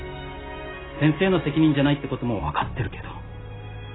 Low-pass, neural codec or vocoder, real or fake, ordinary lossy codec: 7.2 kHz; none; real; AAC, 16 kbps